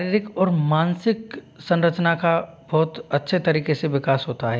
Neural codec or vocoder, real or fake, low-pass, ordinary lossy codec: none; real; none; none